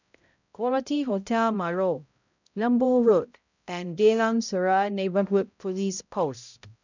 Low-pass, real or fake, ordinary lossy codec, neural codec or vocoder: 7.2 kHz; fake; none; codec, 16 kHz, 0.5 kbps, X-Codec, HuBERT features, trained on balanced general audio